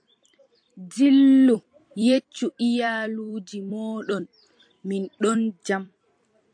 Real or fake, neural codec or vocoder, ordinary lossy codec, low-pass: fake; vocoder, 44.1 kHz, 128 mel bands every 256 samples, BigVGAN v2; AAC, 64 kbps; 9.9 kHz